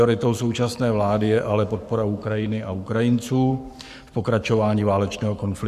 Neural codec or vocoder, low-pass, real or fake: codec, 44.1 kHz, 7.8 kbps, Pupu-Codec; 14.4 kHz; fake